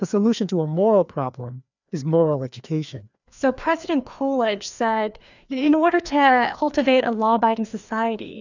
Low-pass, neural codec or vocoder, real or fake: 7.2 kHz; codec, 16 kHz, 2 kbps, FreqCodec, larger model; fake